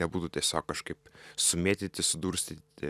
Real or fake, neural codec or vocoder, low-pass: fake; vocoder, 44.1 kHz, 128 mel bands every 256 samples, BigVGAN v2; 14.4 kHz